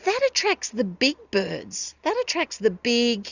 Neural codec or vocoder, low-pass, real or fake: none; 7.2 kHz; real